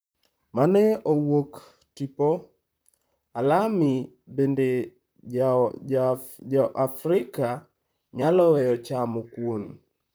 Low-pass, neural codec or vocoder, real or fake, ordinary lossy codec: none; vocoder, 44.1 kHz, 128 mel bands, Pupu-Vocoder; fake; none